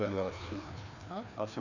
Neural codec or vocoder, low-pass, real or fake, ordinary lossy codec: codec, 16 kHz, 2 kbps, FreqCodec, larger model; 7.2 kHz; fake; none